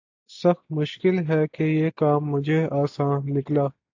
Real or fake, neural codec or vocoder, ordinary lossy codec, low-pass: real; none; AAC, 48 kbps; 7.2 kHz